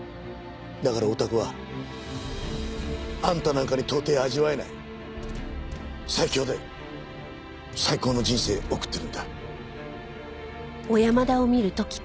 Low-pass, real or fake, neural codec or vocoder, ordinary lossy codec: none; real; none; none